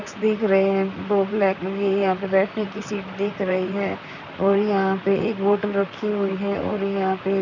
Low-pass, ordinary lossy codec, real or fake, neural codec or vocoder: 7.2 kHz; none; fake; vocoder, 44.1 kHz, 128 mel bands, Pupu-Vocoder